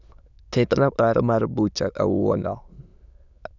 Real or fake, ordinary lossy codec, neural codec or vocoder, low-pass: fake; none; autoencoder, 22.05 kHz, a latent of 192 numbers a frame, VITS, trained on many speakers; 7.2 kHz